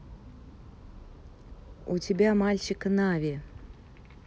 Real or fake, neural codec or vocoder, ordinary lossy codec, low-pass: real; none; none; none